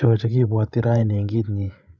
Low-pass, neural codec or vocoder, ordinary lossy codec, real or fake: none; codec, 16 kHz, 16 kbps, FreqCodec, larger model; none; fake